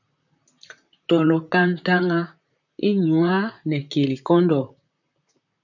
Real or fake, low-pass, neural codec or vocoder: fake; 7.2 kHz; vocoder, 44.1 kHz, 128 mel bands, Pupu-Vocoder